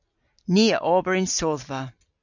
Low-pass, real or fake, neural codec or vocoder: 7.2 kHz; real; none